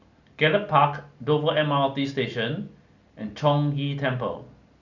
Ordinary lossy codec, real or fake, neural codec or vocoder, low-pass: none; real; none; 7.2 kHz